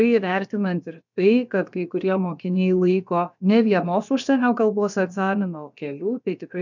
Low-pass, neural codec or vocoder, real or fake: 7.2 kHz; codec, 16 kHz, about 1 kbps, DyCAST, with the encoder's durations; fake